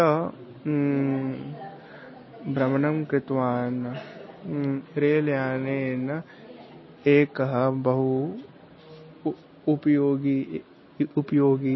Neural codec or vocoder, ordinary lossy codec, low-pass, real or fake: none; MP3, 24 kbps; 7.2 kHz; real